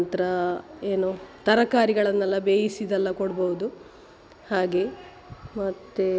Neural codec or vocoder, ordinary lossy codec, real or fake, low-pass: none; none; real; none